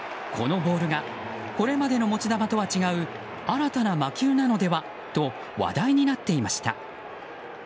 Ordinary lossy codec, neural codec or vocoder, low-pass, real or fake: none; none; none; real